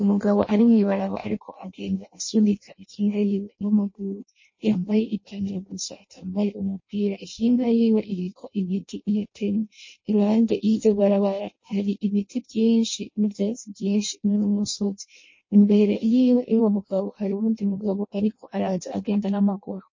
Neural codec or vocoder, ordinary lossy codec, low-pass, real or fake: codec, 16 kHz in and 24 kHz out, 0.6 kbps, FireRedTTS-2 codec; MP3, 32 kbps; 7.2 kHz; fake